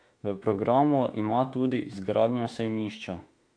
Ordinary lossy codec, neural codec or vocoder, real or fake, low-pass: AAC, 48 kbps; autoencoder, 48 kHz, 32 numbers a frame, DAC-VAE, trained on Japanese speech; fake; 9.9 kHz